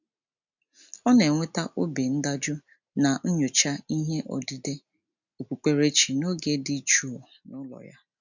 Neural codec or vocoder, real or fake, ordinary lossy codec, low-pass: none; real; none; 7.2 kHz